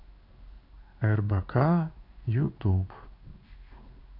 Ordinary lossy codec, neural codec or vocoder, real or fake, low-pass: none; codec, 16 kHz in and 24 kHz out, 1 kbps, XY-Tokenizer; fake; 5.4 kHz